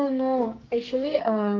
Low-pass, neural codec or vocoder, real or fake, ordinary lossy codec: 7.2 kHz; codec, 44.1 kHz, 2.6 kbps, SNAC; fake; Opus, 32 kbps